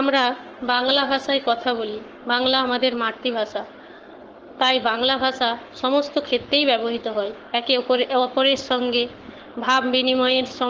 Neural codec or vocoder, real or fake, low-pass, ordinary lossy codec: codec, 44.1 kHz, 7.8 kbps, Pupu-Codec; fake; 7.2 kHz; Opus, 16 kbps